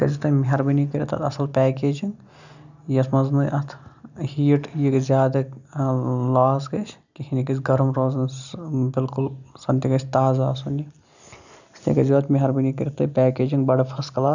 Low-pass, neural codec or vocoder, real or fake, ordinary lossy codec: 7.2 kHz; none; real; none